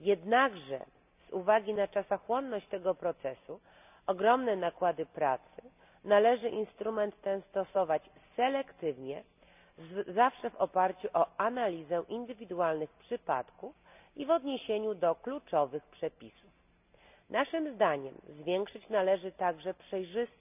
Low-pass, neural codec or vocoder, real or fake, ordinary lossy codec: 3.6 kHz; none; real; none